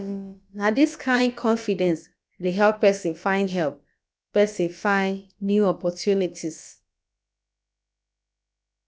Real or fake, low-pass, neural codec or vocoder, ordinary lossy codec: fake; none; codec, 16 kHz, about 1 kbps, DyCAST, with the encoder's durations; none